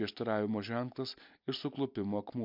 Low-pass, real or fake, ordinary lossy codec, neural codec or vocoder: 5.4 kHz; real; MP3, 48 kbps; none